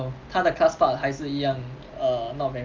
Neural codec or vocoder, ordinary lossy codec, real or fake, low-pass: none; Opus, 24 kbps; real; 7.2 kHz